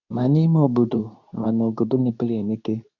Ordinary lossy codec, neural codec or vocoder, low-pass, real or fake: none; codec, 24 kHz, 0.9 kbps, WavTokenizer, medium speech release version 2; 7.2 kHz; fake